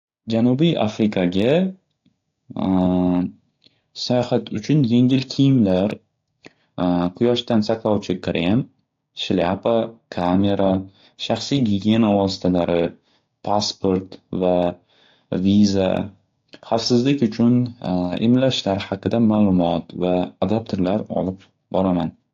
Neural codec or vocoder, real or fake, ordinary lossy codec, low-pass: codec, 16 kHz, 6 kbps, DAC; fake; AAC, 48 kbps; 7.2 kHz